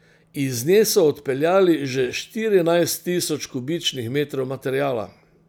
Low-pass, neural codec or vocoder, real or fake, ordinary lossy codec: none; none; real; none